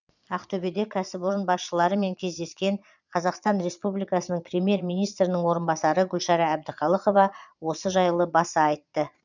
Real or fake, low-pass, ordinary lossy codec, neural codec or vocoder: fake; 7.2 kHz; none; vocoder, 22.05 kHz, 80 mel bands, Vocos